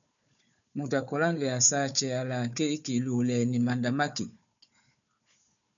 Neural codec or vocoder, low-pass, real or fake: codec, 16 kHz, 4 kbps, FunCodec, trained on Chinese and English, 50 frames a second; 7.2 kHz; fake